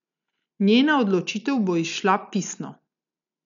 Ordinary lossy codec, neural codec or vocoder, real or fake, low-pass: none; none; real; 7.2 kHz